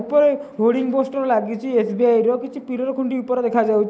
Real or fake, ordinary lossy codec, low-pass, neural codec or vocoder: real; none; none; none